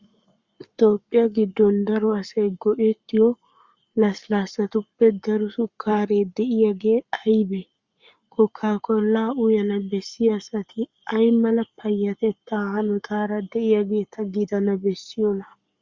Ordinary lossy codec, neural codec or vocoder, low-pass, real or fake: Opus, 64 kbps; codec, 44.1 kHz, 7.8 kbps, Pupu-Codec; 7.2 kHz; fake